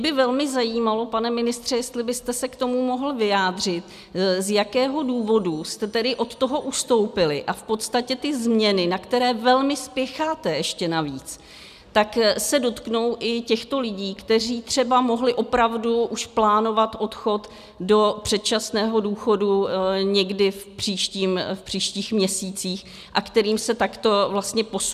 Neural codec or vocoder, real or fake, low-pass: none; real; 14.4 kHz